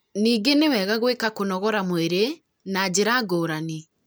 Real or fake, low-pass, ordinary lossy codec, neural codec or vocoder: real; none; none; none